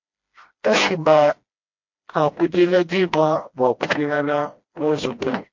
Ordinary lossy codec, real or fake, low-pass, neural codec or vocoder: MP3, 48 kbps; fake; 7.2 kHz; codec, 16 kHz, 1 kbps, FreqCodec, smaller model